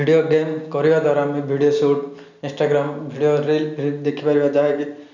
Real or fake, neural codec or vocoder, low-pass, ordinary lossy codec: real; none; 7.2 kHz; none